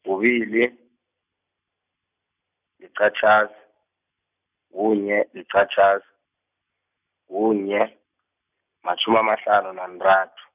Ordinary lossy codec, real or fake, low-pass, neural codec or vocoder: none; real; 3.6 kHz; none